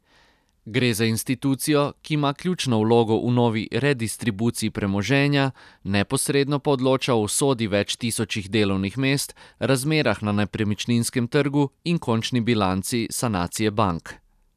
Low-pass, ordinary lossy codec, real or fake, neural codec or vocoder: 14.4 kHz; none; real; none